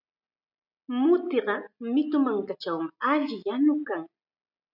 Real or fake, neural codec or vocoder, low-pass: real; none; 5.4 kHz